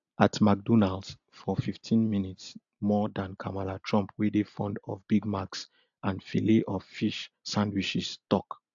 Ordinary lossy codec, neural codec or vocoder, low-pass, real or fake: AAC, 64 kbps; none; 7.2 kHz; real